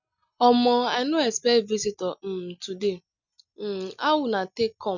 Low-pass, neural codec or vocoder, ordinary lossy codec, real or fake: 7.2 kHz; none; none; real